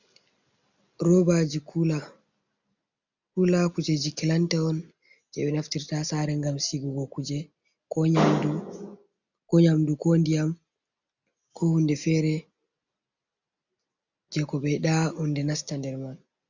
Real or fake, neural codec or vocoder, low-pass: real; none; 7.2 kHz